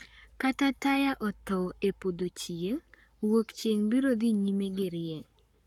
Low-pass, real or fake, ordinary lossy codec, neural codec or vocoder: 19.8 kHz; fake; none; codec, 44.1 kHz, 7.8 kbps, Pupu-Codec